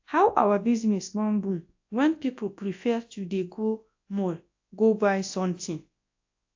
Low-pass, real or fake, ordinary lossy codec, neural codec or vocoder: 7.2 kHz; fake; AAC, 48 kbps; codec, 24 kHz, 0.9 kbps, WavTokenizer, large speech release